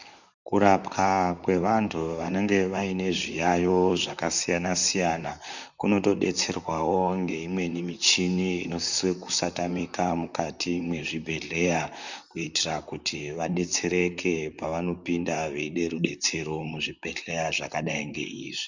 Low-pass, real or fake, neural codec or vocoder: 7.2 kHz; fake; vocoder, 44.1 kHz, 128 mel bands, Pupu-Vocoder